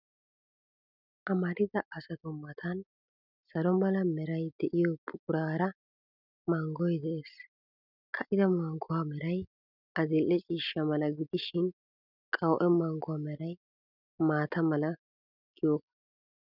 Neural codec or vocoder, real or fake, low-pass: none; real; 5.4 kHz